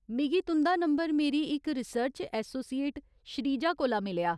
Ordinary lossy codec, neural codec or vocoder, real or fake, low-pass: none; none; real; none